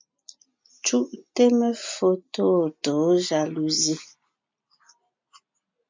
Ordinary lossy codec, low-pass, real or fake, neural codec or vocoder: MP3, 64 kbps; 7.2 kHz; real; none